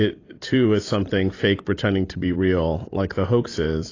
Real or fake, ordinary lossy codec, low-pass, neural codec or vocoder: fake; AAC, 32 kbps; 7.2 kHz; vocoder, 44.1 kHz, 128 mel bands every 256 samples, BigVGAN v2